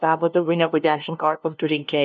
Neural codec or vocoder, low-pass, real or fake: codec, 16 kHz, 0.5 kbps, FunCodec, trained on LibriTTS, 25 frames a second; 7.2 kHz; fake